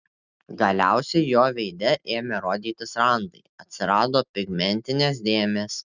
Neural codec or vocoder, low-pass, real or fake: none; 7.2 kHz; real